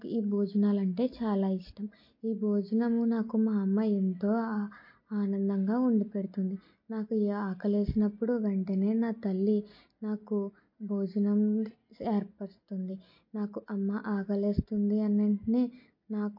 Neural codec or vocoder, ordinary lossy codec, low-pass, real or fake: none; MP3, 32 kbps; 5.4 kHz; real